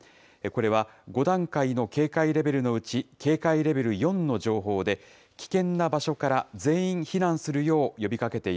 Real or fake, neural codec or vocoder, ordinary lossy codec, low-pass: real; none; none; none